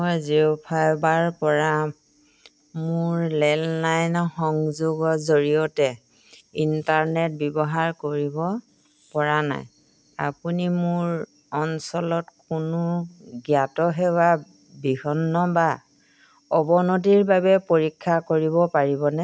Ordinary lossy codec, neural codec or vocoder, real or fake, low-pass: none; none; real; none